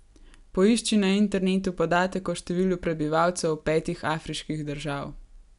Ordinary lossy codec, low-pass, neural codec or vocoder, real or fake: none; 10.8 kHz; none; real